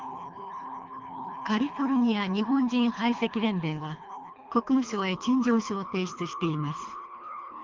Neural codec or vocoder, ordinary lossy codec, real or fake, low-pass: codec, 24 kHz, 3 kbps, HILCodec; Opus, 24 kbps; fake; 7.2 kHz